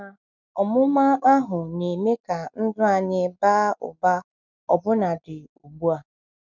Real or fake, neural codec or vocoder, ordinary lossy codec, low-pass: real; none; none; 7.2 kHz